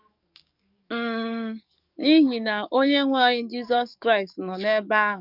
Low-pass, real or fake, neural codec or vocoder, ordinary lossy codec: 5.4 kHz; fake; codec, 44.1 kHz, 7.8 kbps, DAC; none